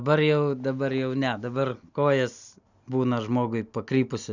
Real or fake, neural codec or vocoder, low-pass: real; none; 7.2 kHz